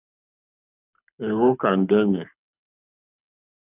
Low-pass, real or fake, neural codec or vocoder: 3.6 kHz; fake; codec, 24 kHz, 6 kbps, HILCodec